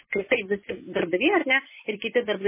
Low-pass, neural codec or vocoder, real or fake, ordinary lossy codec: 3.6 kHz; vocoder, 44.1 kHz, 128 mel bands every 256 samples, BigVGAN v2; fake; MP3, 16 kbps